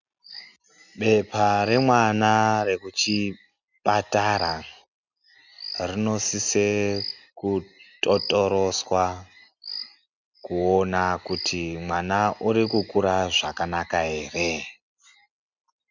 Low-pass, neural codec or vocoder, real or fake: 7.2 kHz; none; real